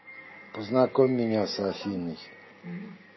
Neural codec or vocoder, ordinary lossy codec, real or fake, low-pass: none; MP3, 24 kbps; real; 7.2 kHz